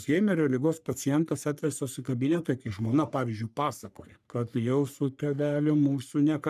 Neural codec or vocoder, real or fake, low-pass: codec, 44.1 kHz, 3.4 kbps, Pupu-Codec; fake; 14.4 kHz